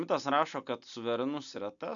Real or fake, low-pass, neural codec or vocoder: real; 7.2 kHz; none